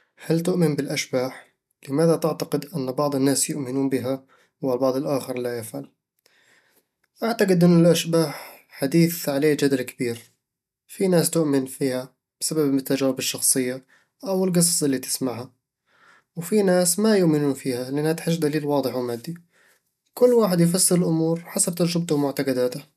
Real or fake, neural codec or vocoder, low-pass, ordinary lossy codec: real; none; 14.4 kHz; none